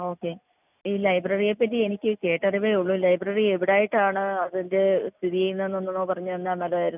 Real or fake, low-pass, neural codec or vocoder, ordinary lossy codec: real; 3.6 kHz; none; none